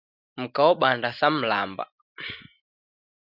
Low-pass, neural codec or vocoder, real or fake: 5.4 kHz; none; real